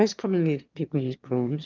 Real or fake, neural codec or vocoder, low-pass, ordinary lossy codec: fake; autoencoder, 22.05 kHz, a latent of 192 numbers a frame, VITS, trained on one speaker; 7.2 kHz; Opus, 24 kbps